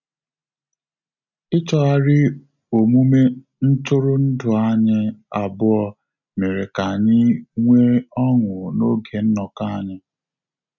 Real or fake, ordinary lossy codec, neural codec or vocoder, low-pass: real; none; none; 7.2 kHz